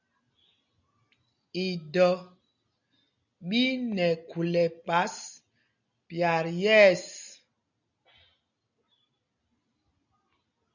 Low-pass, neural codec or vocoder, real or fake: 7.2 kHz; none; real